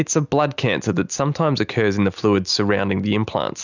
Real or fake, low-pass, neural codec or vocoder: real; 7.2 kHz; none